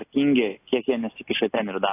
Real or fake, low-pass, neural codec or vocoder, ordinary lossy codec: real; 3.6 kHz; none; AAC, 24 kbps